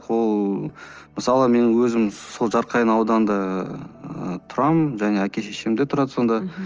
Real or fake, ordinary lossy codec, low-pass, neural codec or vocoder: real; Opus, 24 kbps; 7.2 kHz; none